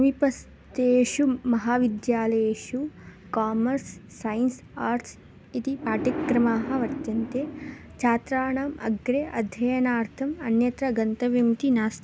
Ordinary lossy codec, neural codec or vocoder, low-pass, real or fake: none; none; none; real